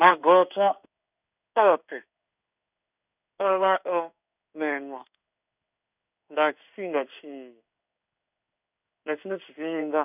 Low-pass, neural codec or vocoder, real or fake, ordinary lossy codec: 3.6 kHz; codec, 16 kHz in and 24 kHz out, 1 kbps, XY-Tokenizer; fake; none